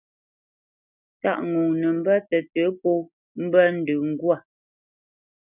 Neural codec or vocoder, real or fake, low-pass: none; real; 3.6 kHz